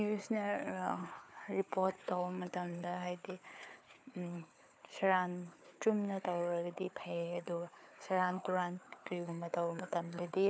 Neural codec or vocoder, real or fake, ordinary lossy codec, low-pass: codec, 16 kHz, 4 kbps, FunCodec, trained on Chinese and English, 50 frames a second; fake; none; none